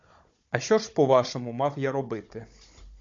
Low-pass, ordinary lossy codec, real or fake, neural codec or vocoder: 7.2 kHz; MP3, 48 kbps; fake; codec, 16 kHz, 16 kbps, FunCodec, trained on Chinese and English, 50 frames a second